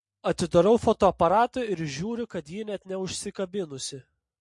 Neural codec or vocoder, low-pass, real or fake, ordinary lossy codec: none; 10.8 kHz; real; MP3, 48 kbps